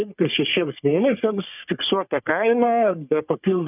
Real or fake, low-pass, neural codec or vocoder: fake; 3.6 kHz; codec, 44.1 kHz, 3.4 kbps, Pupu-Codec